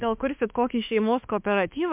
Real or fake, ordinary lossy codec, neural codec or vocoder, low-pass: fake; MP3, 32 kbps; autoencoder, 48 kHz, 32 numbers a frame, DAC-VAE, trained on Japanese speech; 3.6 kHz